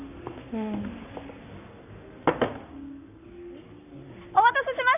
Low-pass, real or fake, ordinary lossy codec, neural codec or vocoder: 3.6 kHz; fake; none; codec, 44.1 kHz, 7.8 kbps, Pupu-Codec